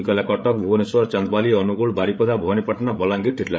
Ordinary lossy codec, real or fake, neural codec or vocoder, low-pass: none; fake; codec, 16 kHz, 8 kbps, FreqCodec, larger model; none